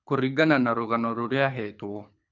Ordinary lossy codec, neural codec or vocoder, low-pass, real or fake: none; codec, 24 kHz, 6 kbps, HILCodec; 7.2 kHz; fake